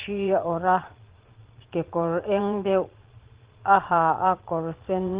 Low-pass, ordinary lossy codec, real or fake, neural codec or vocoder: 3.6 kHz; Opus, 16 kbps; fake; vocoder, 22.05 kHz, 80 mel bands, Vocos